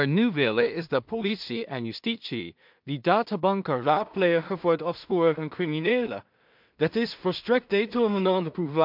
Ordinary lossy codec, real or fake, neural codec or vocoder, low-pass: MP3, 48 kbps; fake; codec, 16 kHz in and 24 kHz out, 0.4 kbps, LongCat-Audio-Codec, two codebook decoder; 5.4 kHz